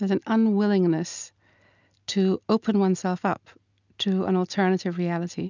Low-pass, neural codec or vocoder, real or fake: 7.2 kHz; none; real